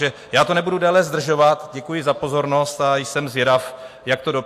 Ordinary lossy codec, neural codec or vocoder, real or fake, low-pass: AAC, 64 kbps; none; real; 14.4 kHz